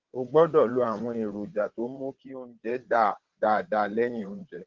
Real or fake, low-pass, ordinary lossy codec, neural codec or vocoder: fake; 7.2 kHz; Opus, 16 kbps; vocoder, 22.05 kHz, 80 mel bands, WaveNeXt